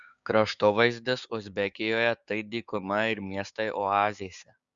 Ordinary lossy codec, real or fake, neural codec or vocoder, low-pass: Opus, 64 kbps; fake; codec, 16 kHz, 6 kbps, DAC; 7.2 kHz